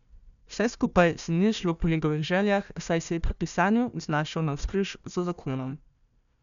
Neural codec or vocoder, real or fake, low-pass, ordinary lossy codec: codec, 16 kHz, 1 kbps, FunCodec, trained on Chinese and English, 50 frames a second; fake; 7.2 kHz; none